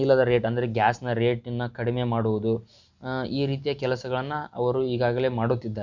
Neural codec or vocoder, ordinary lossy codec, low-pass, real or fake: none; Opus, 64 kbps; 7.2 kHz; real